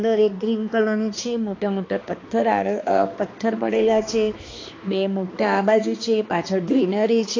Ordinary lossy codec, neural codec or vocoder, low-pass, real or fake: AAC, 32 kbps; codec, 16 kHz, 2 kbps, X-Codec, HuBERT features, trained on balanced general audio; 7.2 kHz; fake